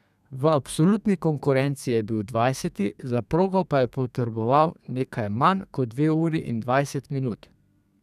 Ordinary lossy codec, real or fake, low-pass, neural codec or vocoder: none; fake; 14.4 kHz; codec, 32 kHz, 1.9 kbps, SNAC